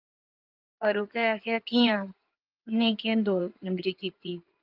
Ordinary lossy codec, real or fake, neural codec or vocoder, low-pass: Opus, 24 kbps; fake; codec, 16 kHz in and 24 kHz out, 2.2 kbps, FireRedTTS-2 codec; 5.4 kHz